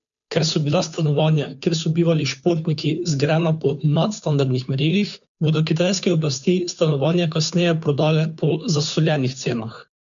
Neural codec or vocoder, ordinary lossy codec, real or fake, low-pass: codec, 16 kHz, 2 kbps, FunCodec, trained on Chinese and English, 25 frames a second; none; fake; 7.2 kHz